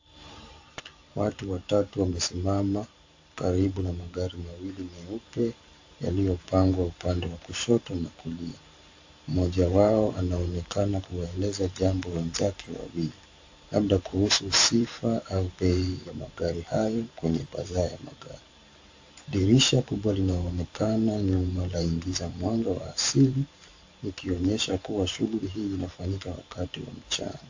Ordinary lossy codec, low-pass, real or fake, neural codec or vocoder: AAC, 48 kbps; 7.2 kHz; fake; vocoder, 44.1 kHz, 128 mel bands every 512 samples, BigVGAN v2